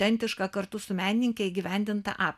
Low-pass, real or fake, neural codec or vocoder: 14.4 kHz; real; none